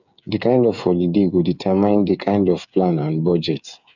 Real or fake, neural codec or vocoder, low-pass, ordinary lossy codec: fake; codec, 16 kHz, 8 kbps, FreqCodec, smaller model; 7.2 kHz; none